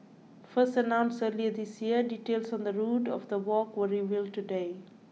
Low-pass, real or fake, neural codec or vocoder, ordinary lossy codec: none; real; none; none